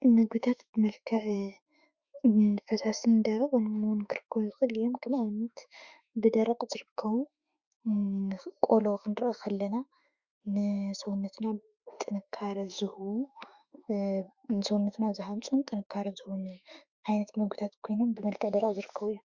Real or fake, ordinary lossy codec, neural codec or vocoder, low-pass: fake; Opus, 64 kbps; autoencoder, 48 kHz, 32 numbers a frame, DAC-VAE, trained on Japanese speech; 7.2 kHz